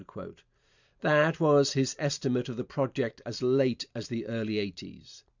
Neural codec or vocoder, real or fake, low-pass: vocoder, 44.1 kHz, 128 mel bands every 512 samples, BigVGAN v2; fake; 7.2 kHz